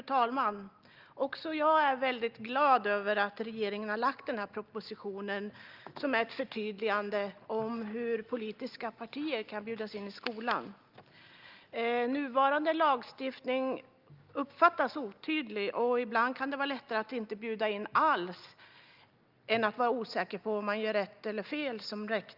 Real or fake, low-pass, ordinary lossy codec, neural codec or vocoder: real; 5.4 kHz; Opus, 24 kbps; none